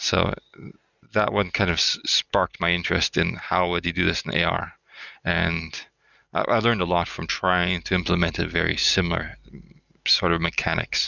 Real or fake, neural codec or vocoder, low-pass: real; none; 7.2 kHz